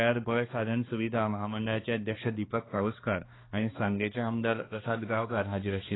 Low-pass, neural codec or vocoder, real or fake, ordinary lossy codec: 7.2 kHz; codec, 16 kHz, 2 kbps, X-Codec, HuBERT features, trained on balanced general audio; fake; AAC, 16 kbps